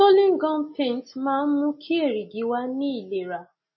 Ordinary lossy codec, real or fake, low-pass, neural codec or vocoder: MP3, 24 kbps; real; 7.2 kHz; none